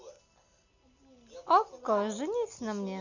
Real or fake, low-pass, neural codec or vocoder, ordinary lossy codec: real; 7.2 kHz; none; none